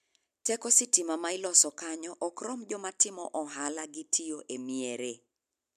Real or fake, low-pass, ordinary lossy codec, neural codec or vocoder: real; 14.4 kHz; MP3, 96 kbps; none